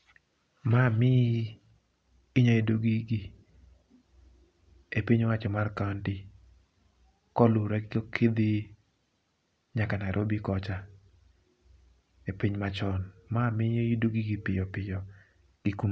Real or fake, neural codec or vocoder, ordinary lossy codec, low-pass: real; none; none; none